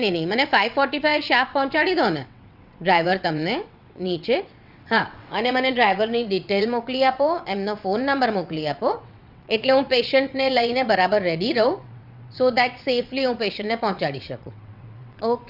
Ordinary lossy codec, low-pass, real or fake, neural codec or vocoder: Opus, 64 kbps; 5.4 kHz; fake; vocoder, 22.05 kHz, 80 mel bands, WaveNeXt